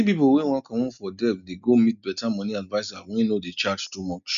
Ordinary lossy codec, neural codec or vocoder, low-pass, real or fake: none; none; 7.2 kHz; real